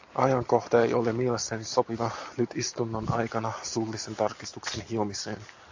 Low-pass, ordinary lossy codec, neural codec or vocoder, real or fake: 7.2 kHz; AAC, 48 kbps; none; real